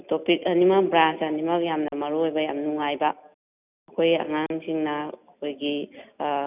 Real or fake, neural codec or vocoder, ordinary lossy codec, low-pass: real; none; none; 3.6 kHz